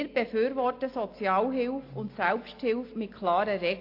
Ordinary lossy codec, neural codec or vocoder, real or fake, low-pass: AAC, 32 kbps; none; real; 5.4 kHz